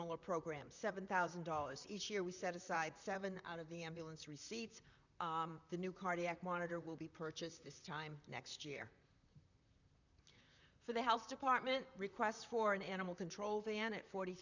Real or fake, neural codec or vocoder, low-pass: fake; vocoder, 44.1 kHz, 128 mel bands, Pupu-Vocoder; 7.2 kHz